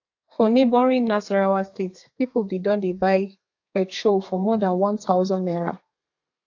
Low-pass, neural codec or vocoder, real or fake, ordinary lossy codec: 7.2 kHz; codec, 32 kHz, 1.9 kbps, SNAC; fake; AAC, 48 kbps